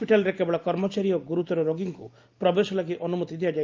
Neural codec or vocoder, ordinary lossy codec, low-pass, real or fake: none; Opus, 32 kbps; 7.2 kHz; real